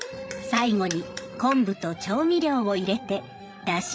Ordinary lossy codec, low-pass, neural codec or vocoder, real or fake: none; none; codec, 16 kHz, 8 kbps, FreqCodec, larger model; fake